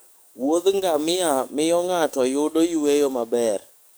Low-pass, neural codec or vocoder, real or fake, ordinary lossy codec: none; codec, 44.1 kHz, 7.8 kbps, DAC; fake; none